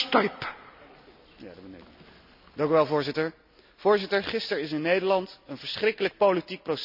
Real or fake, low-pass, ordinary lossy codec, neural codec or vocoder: real; 5.4 kHz; none; none